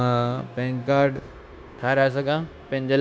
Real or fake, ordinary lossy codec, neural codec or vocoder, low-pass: fake; none; codec, 16 kHz, 0.9 kbps, LongCat-Audio-Codec; none